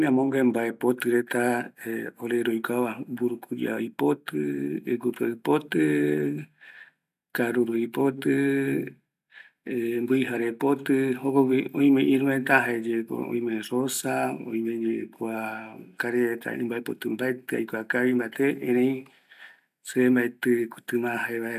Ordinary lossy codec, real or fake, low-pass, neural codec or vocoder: none; real; 14.4 kHz; none